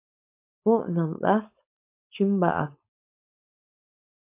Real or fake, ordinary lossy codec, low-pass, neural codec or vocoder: fake; MP3, 24 kbps; 3.6 kHz; codec, 16 kHz, 4.8 kbps, FACodec